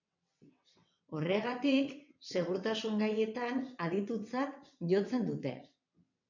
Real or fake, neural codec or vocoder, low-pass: fake; vocoder, 22.05 kHz, 80 mel bands, WaveNeXt; 7.2 kHz